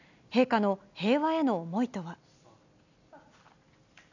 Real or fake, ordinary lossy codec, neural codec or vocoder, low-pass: real; none; none; 7.2 kHz